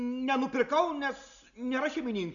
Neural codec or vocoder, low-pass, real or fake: none; 7.2 kHz; real